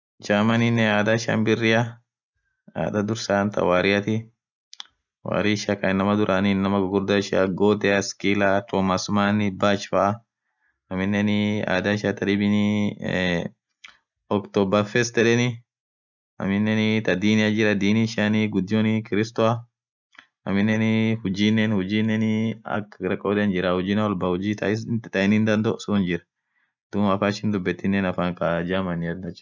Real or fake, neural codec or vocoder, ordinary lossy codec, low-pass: real; none; none; 7.2 kHz